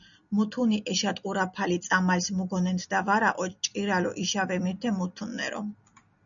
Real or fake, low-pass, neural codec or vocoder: real; 7.2 kHz; none